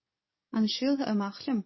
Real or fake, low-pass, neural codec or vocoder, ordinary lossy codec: fake; 7.2 kHz; codec, 44.1 kHz, 7.8 kbps, DAC; MP3, 24 kbps